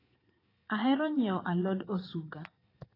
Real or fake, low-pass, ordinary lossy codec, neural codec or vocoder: fake; 5.4 kHz; AAC, 24 kbps; vocoder, 22.05 kHz, 80 mel bands, Vocos